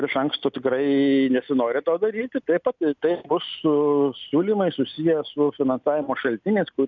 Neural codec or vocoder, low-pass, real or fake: none; 7.2 kHz; real